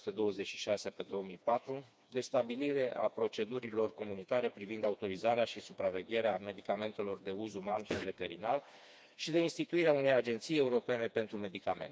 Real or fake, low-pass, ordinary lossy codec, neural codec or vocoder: fake; none; none; codec, 16 kHz, 2 kbps, FreqCodec, smaller model